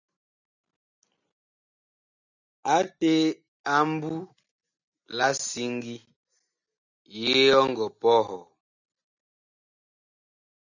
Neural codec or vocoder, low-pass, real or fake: none; 7.2 kHz; real